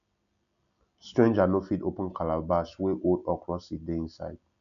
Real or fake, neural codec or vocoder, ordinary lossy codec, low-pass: real; none; none; 7.2 kHz